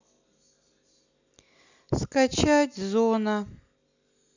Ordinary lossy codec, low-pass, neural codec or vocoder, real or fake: none; 7.2 kHz; none; real